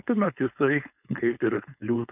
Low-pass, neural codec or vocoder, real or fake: 3.6 kHz; codec, 16 kHz, 4.8 kbps, FACodec; fake